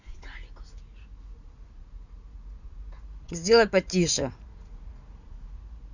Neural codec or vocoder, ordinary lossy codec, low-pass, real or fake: vocoder, 44.1 kHz, 80 mel bands, Vocos; none; 7.2 kHz; fake